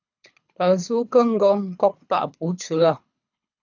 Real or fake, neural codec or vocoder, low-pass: fake; codec, 24 kHz, 3 kbps, HILCodec; 7.2 kHz